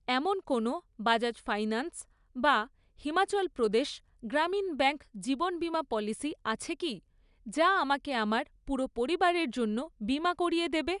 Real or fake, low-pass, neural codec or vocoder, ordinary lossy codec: real; 10.8 kHz; none; none